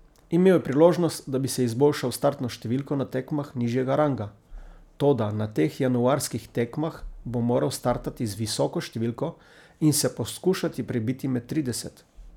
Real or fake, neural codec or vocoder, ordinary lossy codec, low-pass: real; none; none; 19.8 kHz